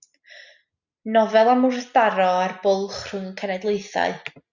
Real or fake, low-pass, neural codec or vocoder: real; 7.2 kHz; none